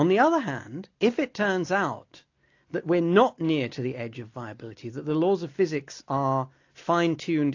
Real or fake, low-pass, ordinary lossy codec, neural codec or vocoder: real; 7.2 kHz; AAC, 48 kbps; none